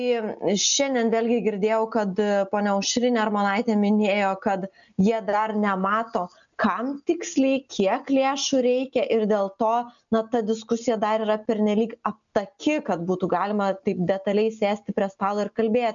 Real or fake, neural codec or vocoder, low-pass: real; none; 7.2 kHz